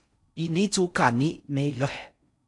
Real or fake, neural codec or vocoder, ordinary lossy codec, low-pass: fake; codec, 16 kHz in and 24 kHz out, 0.6 kbps, FocalCodec, streaming, 4096 codes; MP3, 64 kbps; 10.8 kHz